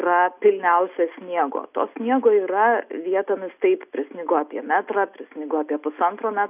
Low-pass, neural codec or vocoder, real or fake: 3.6 kHz; none; real